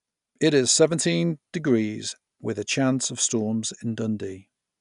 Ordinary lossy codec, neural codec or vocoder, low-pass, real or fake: none; none; 10.8 kHz; real